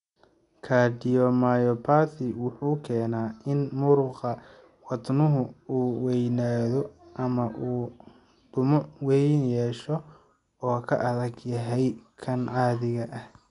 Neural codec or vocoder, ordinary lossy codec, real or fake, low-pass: none; none; real; 10.8 kHz